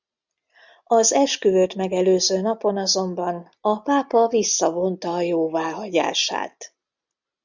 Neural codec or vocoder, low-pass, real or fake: none; 7.2 kHz; real